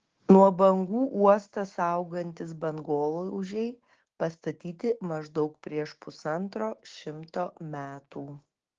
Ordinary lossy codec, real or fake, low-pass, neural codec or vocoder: Opus, 16 kbps; fake; 7.2 kHz; codec, 16 kHz, 6 kbps, DAC